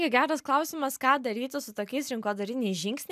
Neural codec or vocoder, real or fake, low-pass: none; real; 14.4 kHz